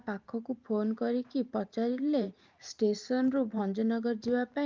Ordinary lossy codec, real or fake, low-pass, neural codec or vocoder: Opus, 24 kbps; fake; 7.2 kHz; vocoder, 44.1 kHz, 128 mel bands every 512 samples, BigVGAN v2